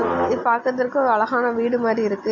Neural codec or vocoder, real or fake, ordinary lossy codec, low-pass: none; real; AAC, 48 kbps; 7.2 kHz